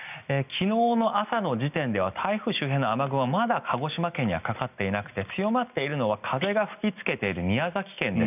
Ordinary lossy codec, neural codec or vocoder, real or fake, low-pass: none; none; real; 3.6 kHz